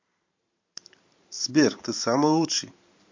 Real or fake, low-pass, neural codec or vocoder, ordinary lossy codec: fake; 7.2 kHz; vocoder, 44.1 kHz, 128 mel bands every 512 samples, BigVGAN v2; MP3, 48 kbps